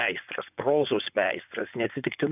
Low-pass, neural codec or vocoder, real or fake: 3.6 kHz; vocoder, 24 kHz, 100 mel bands, Vocos; fake